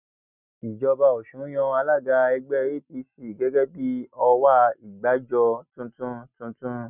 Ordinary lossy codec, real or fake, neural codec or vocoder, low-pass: none; real; none; 3.6 kHz